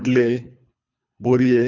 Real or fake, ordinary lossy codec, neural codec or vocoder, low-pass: fake; none; codec, 24 kHz, 3 kbps, HILCodec; 7.2 kHz